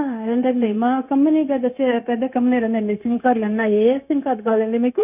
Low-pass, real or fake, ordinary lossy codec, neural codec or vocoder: 3.6 kHz; fake; none; codec, 16 kHz, 0.9 kbps, LongCat-Audio-Codec